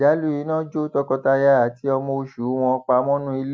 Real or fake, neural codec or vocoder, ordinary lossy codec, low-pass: real; none; none; none